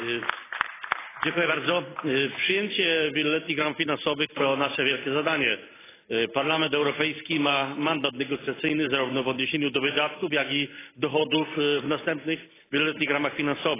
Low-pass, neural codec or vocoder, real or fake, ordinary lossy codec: 3.6 kHz; none; real; AAC, 16 kbps